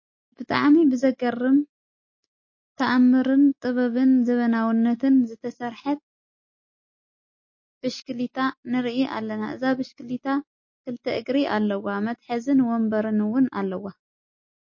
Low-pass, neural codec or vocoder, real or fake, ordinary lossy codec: 7.2 kHz; none; real; MP3, 32 kbps